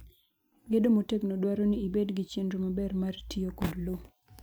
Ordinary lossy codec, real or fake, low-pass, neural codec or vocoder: none; real; none; none